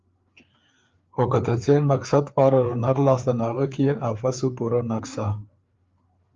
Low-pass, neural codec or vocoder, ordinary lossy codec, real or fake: 7.2 kHz; codec, 16 kHz, 4 kbps, FreqCodec, larger model; Opus, 32 kbps; fake